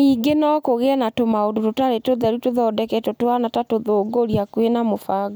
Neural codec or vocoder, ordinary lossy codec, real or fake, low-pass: none; none; real; none